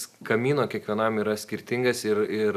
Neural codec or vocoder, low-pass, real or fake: none; 14.4 kHz; real